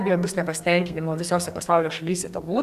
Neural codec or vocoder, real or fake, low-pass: codec, 32 kHz, 1.9 kbps, SNAC; fake; 14.4 kHz